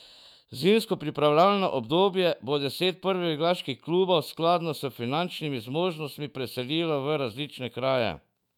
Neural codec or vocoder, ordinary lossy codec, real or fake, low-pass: autoencoder, 48 kHz, 128 numbers a frame, DAC-VAE, trained on Japanese speech; none; fake; 19.8 kHz